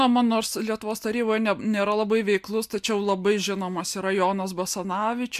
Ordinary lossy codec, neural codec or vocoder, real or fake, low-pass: MP3, 96 kbps; none; real; 14.4 kHz